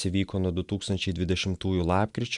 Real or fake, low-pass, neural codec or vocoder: real; 10.8 kHz; none